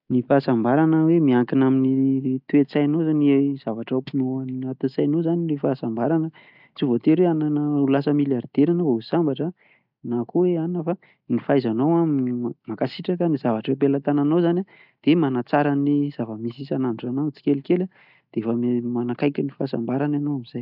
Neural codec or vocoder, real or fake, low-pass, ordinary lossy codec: none; real; 5.4 kHz; none